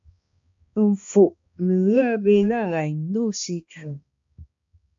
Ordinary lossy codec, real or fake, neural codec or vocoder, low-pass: MP3, 48 kbps; fake; codec, 16 kHz, 1 kbps, X-Codec, HuBERT features, trained on balanced general audio; 7.2 kHz